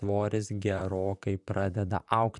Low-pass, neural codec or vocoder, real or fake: 10.8 kHz; vocoder, 44.1 kHz, 128 mel bands, Pupu-Vocoder; fake